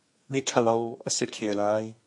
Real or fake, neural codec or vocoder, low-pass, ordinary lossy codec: fake; codec, 32 kHz, 1.9 kbps, SNAC; 10.8 kHz; MP3, 48 kbps